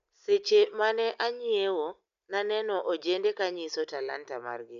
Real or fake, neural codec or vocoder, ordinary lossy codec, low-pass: real; none; none; 7.2 kHz